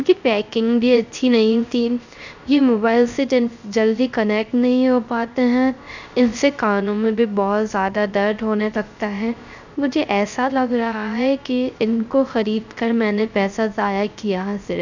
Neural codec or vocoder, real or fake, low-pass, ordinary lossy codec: codec, 16 kHz, 0.3 kbps, FocalCodec; fake; 7.2 kHz; none